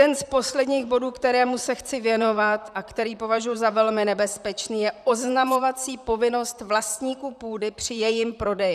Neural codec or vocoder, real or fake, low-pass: vocoder, 44.1 kHz, 128 mel bands, Pupu-Vocoder; fake; 14.4 kHz